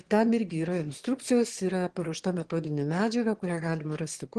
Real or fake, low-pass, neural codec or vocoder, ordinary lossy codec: fake; 9.9 kHz; autoencoder, 22.05 kHz, a latent of 192 numbers a frame, VITS, trained on one speaker; Opus, 16 kbps